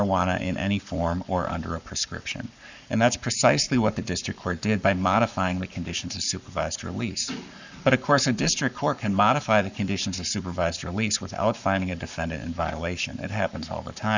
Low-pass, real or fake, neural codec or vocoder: 7.2 kHz; fake; codec, 44.1 kHz, 7.8 kbps, Pupu-Codec